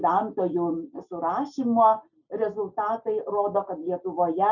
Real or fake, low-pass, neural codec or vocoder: real; 7.2 kHz; none